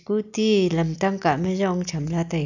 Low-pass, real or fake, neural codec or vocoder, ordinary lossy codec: 7.2 kHz; real; none; none